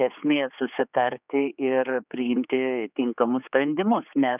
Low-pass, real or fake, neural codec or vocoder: 3.6 kHz; fake; codec, 16 kHz, 4 kbps, X-Codec, HuBERT features, trained on general audio